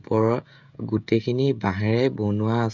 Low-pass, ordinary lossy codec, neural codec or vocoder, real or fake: 7.2 kHz; none; codec, 16 kHz, 16 kbps, FreqCodec, smaller model; fake